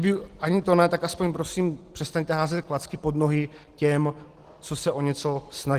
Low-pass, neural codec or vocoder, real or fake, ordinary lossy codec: 14.4 kHz; none; real; Opus, 16 kbps